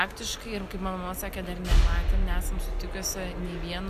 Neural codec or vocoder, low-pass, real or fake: none; 14.4 kHz; real